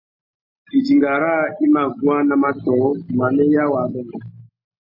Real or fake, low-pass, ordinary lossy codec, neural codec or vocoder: real; 5.4 kHz; MP3, 24 kbps; none